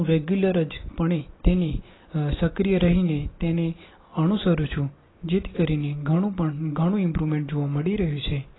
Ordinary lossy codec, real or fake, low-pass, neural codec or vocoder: AAC, 16 kbps; real; 7.2 kHz; none